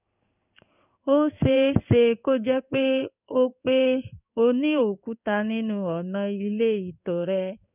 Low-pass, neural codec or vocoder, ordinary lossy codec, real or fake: 3.6 kHz; codec, 16 kHz in and 24 kHz out, 1 kbps, XY-Tokenizer; none; fake